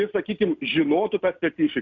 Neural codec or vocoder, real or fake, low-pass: none; real; 7.2 kHz